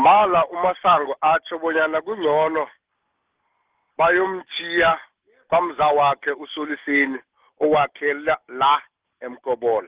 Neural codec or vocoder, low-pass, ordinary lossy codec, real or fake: none; 3.6 kHz; Opus, 16 kbps; real